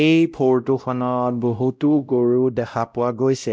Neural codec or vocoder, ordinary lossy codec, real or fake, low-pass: codec, 16 kHz, 0.5 kbps, X-Codec, WavLM features, trained on Multilingual LibriSpeech; none; fake; none